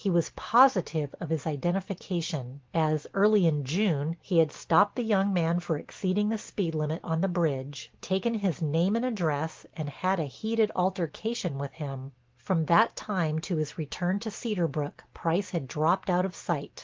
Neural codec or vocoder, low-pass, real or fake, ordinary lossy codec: none; 7.2 kHz; real; Opus, 16 kbps